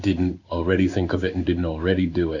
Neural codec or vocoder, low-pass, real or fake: codec, 16 kHz in and 24 kHz out, 1 kbps, XY-Tokenizer; 7.2 kHz; fake